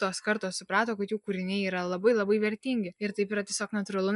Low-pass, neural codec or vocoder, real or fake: 10.8 kHz; none; real